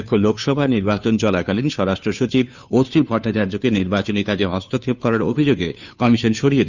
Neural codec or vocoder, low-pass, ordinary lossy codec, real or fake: codec, 16 kHz, 2 kbps, FunCodec, trained on Chinese and English, 25 frames a second; 7.2 kHz; none; fake